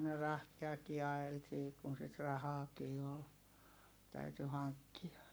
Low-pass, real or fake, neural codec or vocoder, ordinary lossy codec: none; fake; codec, 44.1 kHz, 7.8 kbps, Pupu-Codec; none